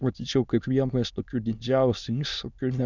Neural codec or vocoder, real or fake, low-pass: autoencoder, 22.05 kHz, a latent of 192 numbers a frame, VITS, trained on many speakers; fake; 7.2 kHz